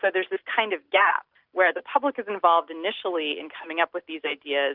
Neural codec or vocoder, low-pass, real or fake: none; 5.4 kHz; real